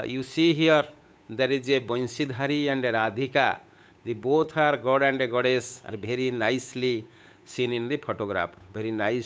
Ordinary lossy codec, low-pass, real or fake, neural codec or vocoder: none; none; fake; codec, 16 kHz, 8 kbps, FunCodec, trained on Chinese and English, 25 frames a second